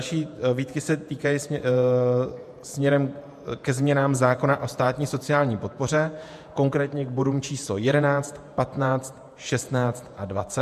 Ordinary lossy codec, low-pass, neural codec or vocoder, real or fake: MP3, 64 kbps; 14.4 kHz; vocoder, 48 kHz, 128 mel bands, Vocos; fake